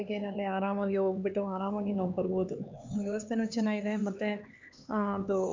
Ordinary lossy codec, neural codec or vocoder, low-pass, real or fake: MP3, 64 kbps; codec, 16 kHz, 4 kbps, X-Codec, HuBERT features, trained on LibriSpeech; 7.2 kHz; fake